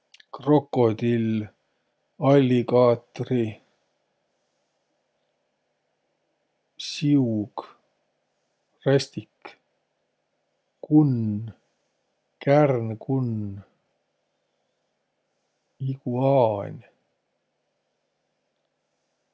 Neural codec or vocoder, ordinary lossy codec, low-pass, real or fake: none; none; none; real